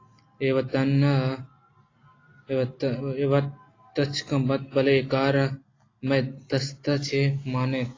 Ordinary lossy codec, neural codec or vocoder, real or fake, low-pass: AAC, 32 kbps; none; real; 7.2 kHz